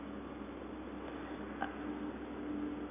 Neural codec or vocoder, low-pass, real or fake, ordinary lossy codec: none; 3.6 kHz; real; none